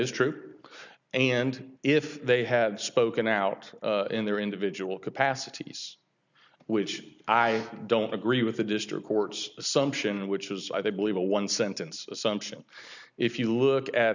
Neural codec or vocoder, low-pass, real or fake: none; 7.2 kHz; real